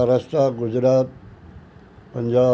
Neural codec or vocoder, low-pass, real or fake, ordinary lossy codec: none; none; real; none